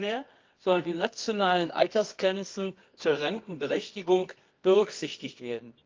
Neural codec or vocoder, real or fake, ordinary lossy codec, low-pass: codec, 24 kHz, 0.9 kbps, WavTokenizer, medium music audio release; fake; Opus, 24 kbps; 7.2 kHz